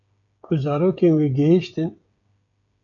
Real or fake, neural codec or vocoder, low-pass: fake; codec, 16 kHz, 16 kbps, FreqCodec, smaller model; 7.2 kHz